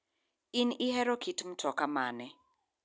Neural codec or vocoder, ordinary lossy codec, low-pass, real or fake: none; none; none; real